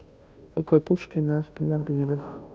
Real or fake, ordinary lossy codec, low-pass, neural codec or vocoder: fake; none; none; codec, 16 kHz, 0.5 kbps, FunCodec, trained on Chinese and English, 25 frames a second